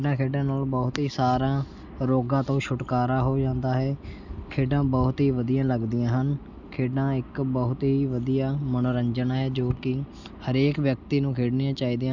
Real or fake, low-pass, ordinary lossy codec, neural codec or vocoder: real; 7.2 kHz; none; none